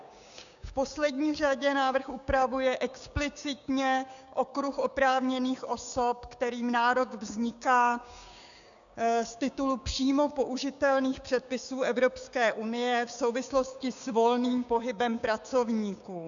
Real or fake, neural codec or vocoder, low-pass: fake; codec, 16 kHz, 6 kbps, DAC; 7.2 kHz